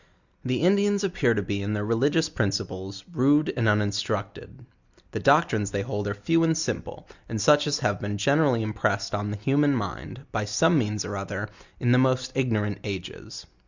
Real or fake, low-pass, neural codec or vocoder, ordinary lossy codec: real; 7.2 kHz; none; Opus, 64 kbps